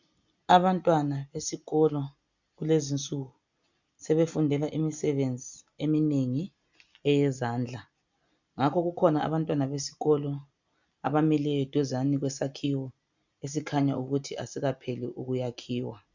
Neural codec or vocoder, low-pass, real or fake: none; 7.2 kHz; real